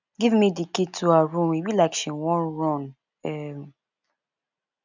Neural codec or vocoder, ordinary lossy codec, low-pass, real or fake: none; none; 7.2 kHz; real